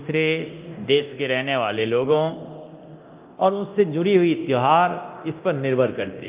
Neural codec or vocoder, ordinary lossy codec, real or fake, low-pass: codec, 24 kHz, 0.9 kbps, DualCodec; Opus, 24 kbps; fake; 3.6 kHz